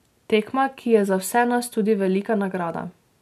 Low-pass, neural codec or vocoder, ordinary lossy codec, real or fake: 14.4 kHz; none; none; real